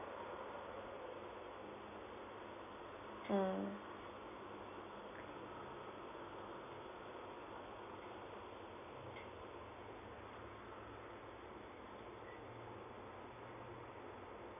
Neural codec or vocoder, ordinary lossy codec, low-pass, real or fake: none; none; 3.6 kHz; real